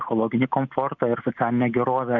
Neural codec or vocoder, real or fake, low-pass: none; real; 7.2 kHz